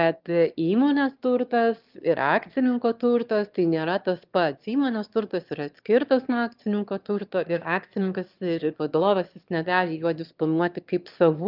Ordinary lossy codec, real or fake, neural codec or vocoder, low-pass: Opus, 32 kbps; fake; autoencoder, 22.05 kHz, a latent of 192 numbers a frame, VITS, trained on one speaker; 5.4 kHz